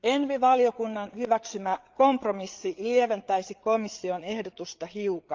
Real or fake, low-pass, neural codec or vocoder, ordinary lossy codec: fake; 7.2 kHz; codec, 16 kHz, 8 kbps, FreqCodec, larger model; Opus, 32 kbps